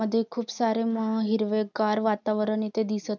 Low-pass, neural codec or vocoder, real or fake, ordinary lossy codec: 7.2 kHz; none; real; none